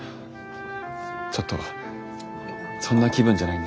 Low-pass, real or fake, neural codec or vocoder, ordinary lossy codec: none; real; none; none